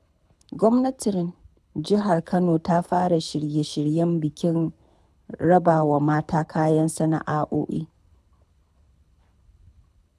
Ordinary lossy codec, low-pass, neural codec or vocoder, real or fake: none; none; codec, 24 kHz, 6 kbps, HILCodec; fake